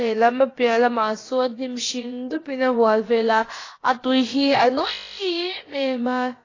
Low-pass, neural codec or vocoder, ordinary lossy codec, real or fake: 7.2 kHz; codec, 16 kHz, about 1 kbps, DyCAST, with the encoder's durations; AAC, 32 kbps; fake